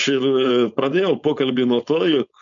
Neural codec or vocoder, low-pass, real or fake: codec, 16 kHz, 4.8 kbps, FACodec; 7.2 kHz; fake